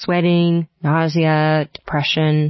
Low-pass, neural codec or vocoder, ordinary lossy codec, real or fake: 7.2 kHz; none; MP3, 24 kbps; real